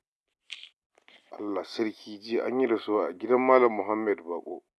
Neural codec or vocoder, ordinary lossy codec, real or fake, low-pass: none; none; real; none